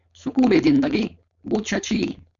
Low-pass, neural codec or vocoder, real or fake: 7.2 kHz; codec, 16 kHz, 4.8 kbps, FACodec; fake